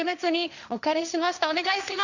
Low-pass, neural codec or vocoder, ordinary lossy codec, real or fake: 7.2 kHz; codec, 16 kHz, 1.1 kbps, Voila-Tokenizer; none; fake